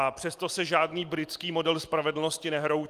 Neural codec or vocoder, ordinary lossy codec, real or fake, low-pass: none; Opus, 32 kbps; real; 14.4 kHz